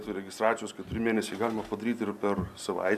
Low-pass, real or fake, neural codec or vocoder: 14.4 kHz; real; none